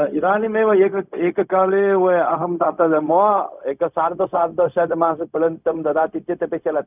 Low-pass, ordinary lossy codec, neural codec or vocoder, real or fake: 3.6 kHz; none; codec, 16 kHz, 0.4 kbps, LongCat-Audio-Codec; fake